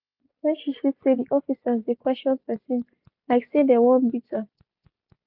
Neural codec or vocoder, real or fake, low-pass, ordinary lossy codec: none; real; 5.4 kHz; none